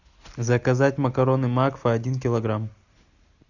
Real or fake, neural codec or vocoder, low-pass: real; none; 7.2 kHz